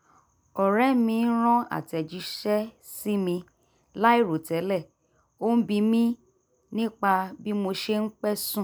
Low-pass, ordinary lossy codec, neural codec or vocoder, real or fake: none; none; none; real